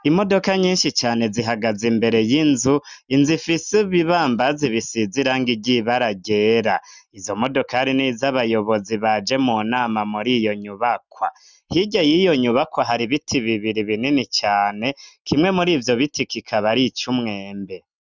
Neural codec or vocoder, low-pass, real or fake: none; 7.2 kHz; real